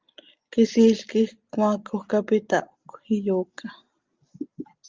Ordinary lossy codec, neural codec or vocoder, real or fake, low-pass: Opus, 32 kbps; none; real; 7.2 kHz